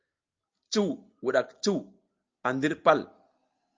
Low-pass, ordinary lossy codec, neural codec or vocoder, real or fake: 7.2 kHz; Opus, 24 kbps; none; real